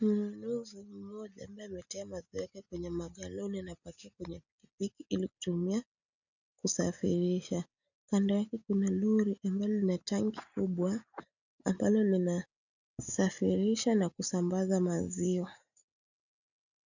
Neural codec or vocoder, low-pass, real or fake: none; 7.2 kHz; real